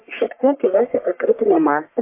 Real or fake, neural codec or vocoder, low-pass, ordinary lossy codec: fake; codec, 44.1 kHz, 1.7 kbps, Pupu-Codec; 3.6 kHz; MP3, 24 kbps